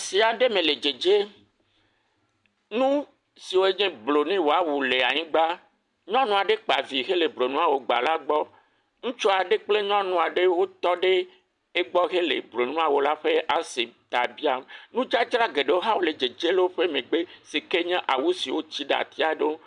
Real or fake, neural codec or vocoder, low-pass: real; none; 10.8 kHz